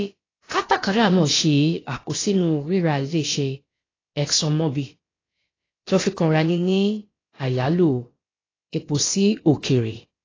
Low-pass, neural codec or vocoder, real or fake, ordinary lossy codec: 7.2 kHz; codec, 16 kHz, about 1 kbps, DyCAST, with the encoder's durations; fake; AAC, 32 kbps